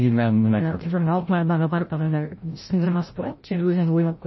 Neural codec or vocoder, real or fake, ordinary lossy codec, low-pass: codec, 16 kHz, 0.5 kbps, FreqCodec, larger model; fake; MP3, 24 kbps; 7.2 kHz